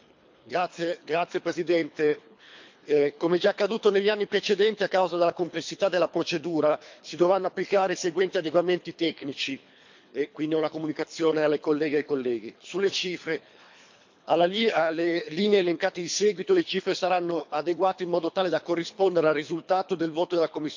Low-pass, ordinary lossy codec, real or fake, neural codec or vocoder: 7.2 kHz; MP3, 48 kbps; fake; codec, 24 kHz, 3 kbps, HILCodec